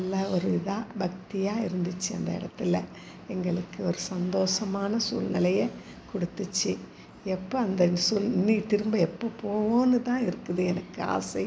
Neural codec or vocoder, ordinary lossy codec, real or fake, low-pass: none; none; real; none